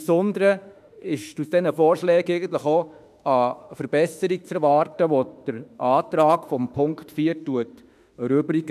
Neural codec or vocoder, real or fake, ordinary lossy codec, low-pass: autoencoder, 48 kHz, 32 numbers a frame, DAC-VAE, trained on Japanese speech; fake; none; 14.4 kHz